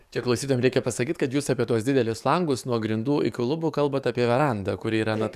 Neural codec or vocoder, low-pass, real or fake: codec, 44.1 kHz, 7.8 kbps, DAC; 14.4 kHz; fake